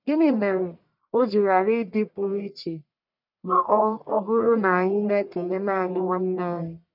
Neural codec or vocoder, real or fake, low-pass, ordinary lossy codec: codec, 44.1 kHz, 1.7 kbps, Pupu-Codec; fake; 5.4 kHz; none